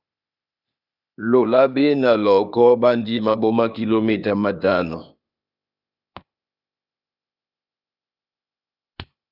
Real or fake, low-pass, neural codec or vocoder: fake; 5.4 kHz; codec, 16 kHz, 0.8 kbps, ZipCodec